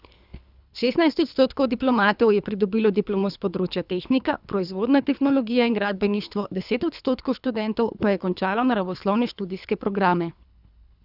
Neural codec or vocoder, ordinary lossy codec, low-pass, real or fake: codec, 24 kHz, 3 kbps, HILCodec; none; 5.4 kHz; fake